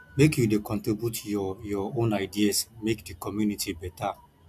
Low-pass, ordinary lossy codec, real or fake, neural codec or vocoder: 14.4 kHz; AAC, 96 kbps; real; none